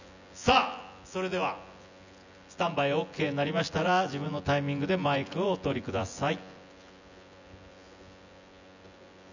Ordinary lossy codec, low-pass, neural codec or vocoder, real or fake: none; 7.2 kHz; vocoder, 24 kHz, 100 mel bands, Vocos; fake